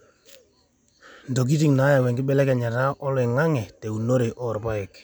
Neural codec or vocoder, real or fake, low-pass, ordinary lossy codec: none; real; none; none